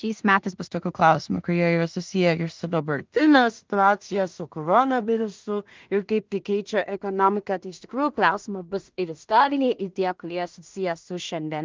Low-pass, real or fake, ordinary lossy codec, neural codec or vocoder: 7.2 kHz; fake; Opus, 32 kbps; codec, 16 kHz in and 24 kHz out, 0.4 kbps, LongCat-Audio-Codec, two codebook decoder